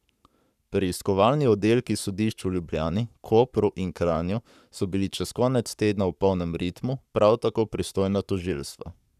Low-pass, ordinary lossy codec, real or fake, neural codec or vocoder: 14.4 kHz; none; fake; codec, 44.1 kHz, 7.8 kbps, Pupu-Codec